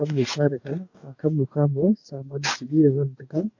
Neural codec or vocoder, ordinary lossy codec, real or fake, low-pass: codec, 44.1 kHz, 2.6 kbps, DAC; AAC, 48 kbps; fake; 7.2 kHz